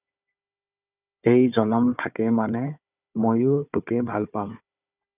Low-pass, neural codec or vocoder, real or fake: 3.6 kHz; codec, 16 kHz, 4 kbps, FunCodec, trained on Chinese and English, 50 frames a second; fake